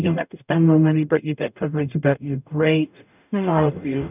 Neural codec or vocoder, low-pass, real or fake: codec, 44.1 kHz, 0.9 kbps, DAC; 3.6 kHz; fake